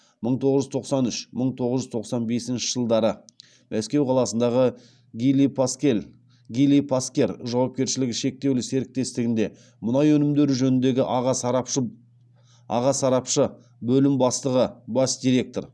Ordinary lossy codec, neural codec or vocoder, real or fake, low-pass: none; none; real; none